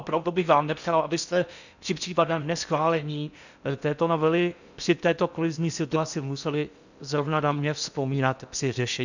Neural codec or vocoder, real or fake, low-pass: codec, 16 kHz in and 24 kHz out, 0.6 kbps, FocalCodec, streaming, 4096 codes; fake; 7.2 kHz